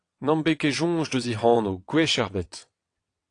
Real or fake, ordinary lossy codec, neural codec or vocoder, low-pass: fake; AAC, 48 kbps; vocoder, 22.05 kHz, 80 mel bands, WaveNeXt; 9.9 kHz